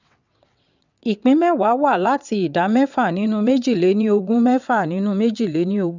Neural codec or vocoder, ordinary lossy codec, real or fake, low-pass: vocoder, 22.05 kHz, 80 mel bands, WaveNeXt; none; fake; 7.2 kHz